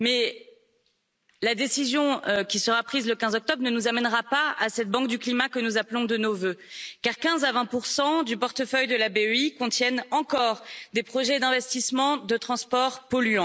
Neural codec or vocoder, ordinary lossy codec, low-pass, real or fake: none; none; none; real